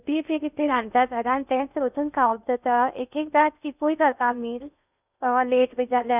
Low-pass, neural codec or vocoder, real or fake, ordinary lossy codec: 3.6 kHz; codec, 16 kHz in and 24 kHz out, 0.6 kbps, FocalCodec, streaming, 2048 codes; fake; none